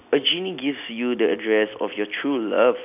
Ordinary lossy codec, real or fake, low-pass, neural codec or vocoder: AAC, 32 kbps; real; 3.6 kHz; none